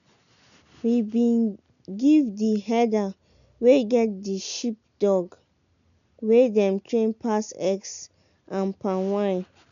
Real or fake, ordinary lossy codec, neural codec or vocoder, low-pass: real; none; none; 7.2 kHz